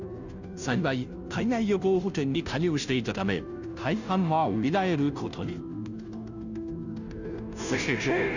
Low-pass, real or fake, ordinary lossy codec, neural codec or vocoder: 7.2 kHz; fake; none; codec, 16 kHz, 0.5 kbps, FunCodec, trained on Chinese and English, 25 frames a second